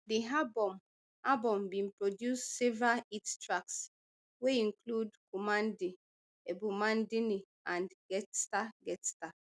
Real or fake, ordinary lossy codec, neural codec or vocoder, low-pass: real; none; none; none